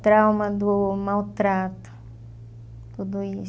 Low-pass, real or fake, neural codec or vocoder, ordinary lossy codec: none; real; none; none